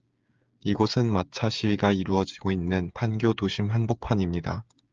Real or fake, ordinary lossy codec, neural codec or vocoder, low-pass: fake; Opus, 24 kbps; codec, 16 kHz, 8 kbps, FreqCodec, smaller model; 7.2 kHz